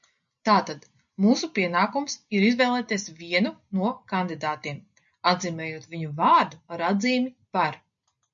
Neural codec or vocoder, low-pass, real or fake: none; 7.2 kHz; real